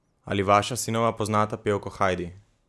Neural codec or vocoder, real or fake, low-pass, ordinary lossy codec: none; real; none; none